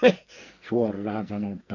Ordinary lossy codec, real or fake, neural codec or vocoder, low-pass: none; fake; codec, 44.1 kHz, 2.6 kbps, SNAC; 7.2 kHz